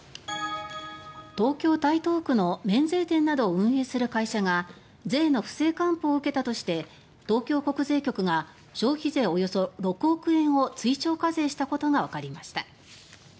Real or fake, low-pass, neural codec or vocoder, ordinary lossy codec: real; none; none; none